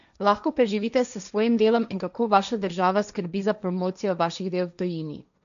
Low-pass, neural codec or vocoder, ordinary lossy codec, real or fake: 7.2 kHz; codec, 16 kHz, 1.1 kbps, Voila-Tokenizer; none; fake